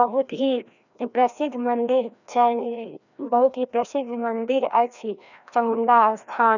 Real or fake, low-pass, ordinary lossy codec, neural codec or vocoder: fake; 7.2 kHz; none; codec, 16 kHz, 1 kbps, FreqCodec, larger model